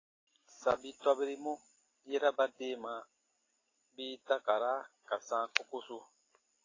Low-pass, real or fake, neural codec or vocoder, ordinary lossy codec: 7.2 kHz; real; none; AAC, 32 kbps